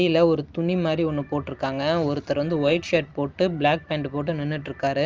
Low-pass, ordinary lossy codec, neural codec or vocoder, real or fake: 7.2 kHz; Opus, 24 kbps; none; real